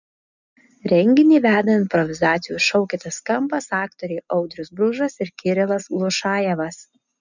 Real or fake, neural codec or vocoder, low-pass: real; none; 7.2 kHz